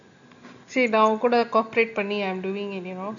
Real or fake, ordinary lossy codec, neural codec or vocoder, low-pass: real; none; none; 7.2 kHz